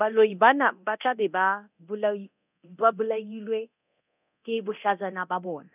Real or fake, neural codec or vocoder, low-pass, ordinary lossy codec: fake; codec, 24 kHz, 0.9 kbps, DualCodec; 3.6 kHz; none